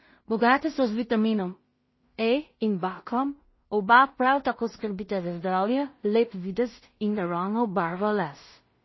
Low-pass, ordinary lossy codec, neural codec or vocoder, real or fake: 7.2 kHz; MP3, 24 kbps; codec, 16 kHz in and 24 kHz out, 0.4 kbps, LongCat-Audio-Codec, two codebook decoder; fake